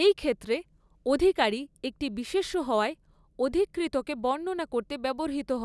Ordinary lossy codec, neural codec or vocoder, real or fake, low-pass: none; none; real; none